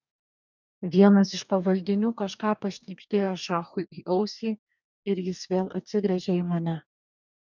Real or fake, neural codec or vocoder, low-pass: fake; codec, 44.1 kHz, 2.6 kbps, DAC; 7.2 kHz